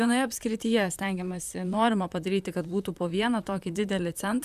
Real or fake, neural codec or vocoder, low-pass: fake; vocoder, 44.1 kHz, 128 mel bands, Pupu-Vocoder; 14.4 kHz